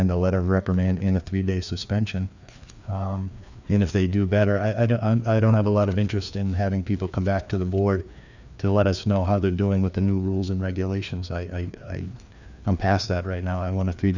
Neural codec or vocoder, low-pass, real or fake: codec, 16 kHz, 2 kbps, FreqCodec, larger model; 7.2 kHz; fake